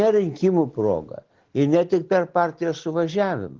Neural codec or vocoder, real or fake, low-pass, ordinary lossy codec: none; real; 7.2 kHz; Opus, 16 kbps